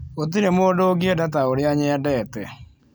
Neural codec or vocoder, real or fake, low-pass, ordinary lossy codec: none; real; none; none